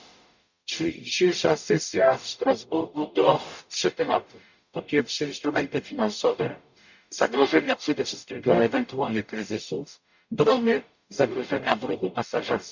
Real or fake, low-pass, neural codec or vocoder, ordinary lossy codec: fake; 7.2 kHz; codec, 44.1 kHz, 0.9 kbps, DAC; none